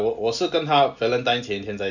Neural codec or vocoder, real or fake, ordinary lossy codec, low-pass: none; real; none; 7.2 kHz